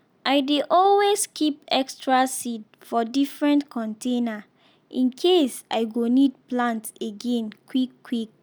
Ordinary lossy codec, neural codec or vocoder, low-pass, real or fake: none; none; none; real